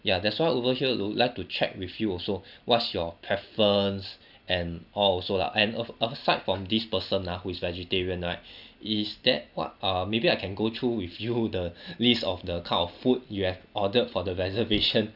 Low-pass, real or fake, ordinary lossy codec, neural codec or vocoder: 5.4 kHz; real; none; none